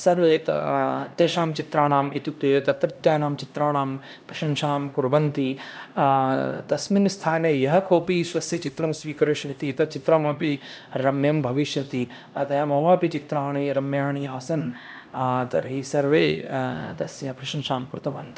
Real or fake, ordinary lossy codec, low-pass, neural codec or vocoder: fake; none; none; codec, 16 kHz, 1 kbps, X-Codec, HuBERT features, trained on LibriSpeech